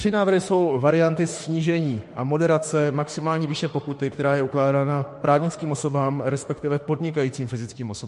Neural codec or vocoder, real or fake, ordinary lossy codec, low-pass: autoencoder, 48 kHz, 32 numbers a frame, DAC-VAE, trained on Japanese speech; fake; MP3, 48 kbps; 14.4 kHz